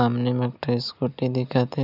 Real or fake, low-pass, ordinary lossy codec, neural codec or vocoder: real; 5.4 kHz; none; none